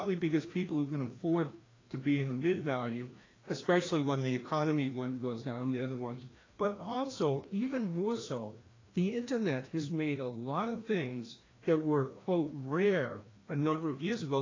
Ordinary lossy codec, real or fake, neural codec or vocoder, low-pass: AAC, 32 kbps; fake; codec, 16 kHz, 1 kbps, FreqCodec, larger model; 7.2 kHz